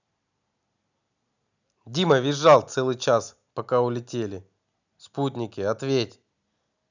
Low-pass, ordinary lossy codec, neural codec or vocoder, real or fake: 7.2 kHz; none; none; real